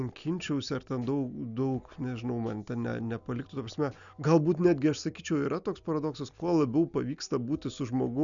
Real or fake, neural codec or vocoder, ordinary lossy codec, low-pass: real; none; MP3, 96 kbps; 7.2 kHz